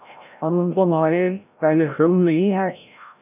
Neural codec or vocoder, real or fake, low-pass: codec, 16 kHz, 0.5 kbps, FreqCodec, larger model; fake; 3.6 kHz